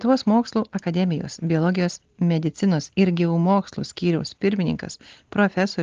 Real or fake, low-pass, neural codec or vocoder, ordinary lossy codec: real; 7.2 kHz; none; Opus, 16 kbps